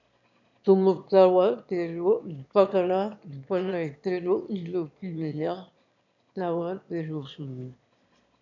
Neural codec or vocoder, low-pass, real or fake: autoencoder, 22.05 kHz, a latent of 192 numbers a frame, VITS, trained on one speaker; 7.2 kHz; fake